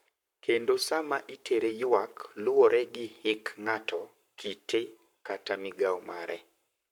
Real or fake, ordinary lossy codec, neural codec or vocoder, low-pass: fake; none; codec, 44.1 kHz, 7.8 kbps, Pupu-Codec; 19.8 kHz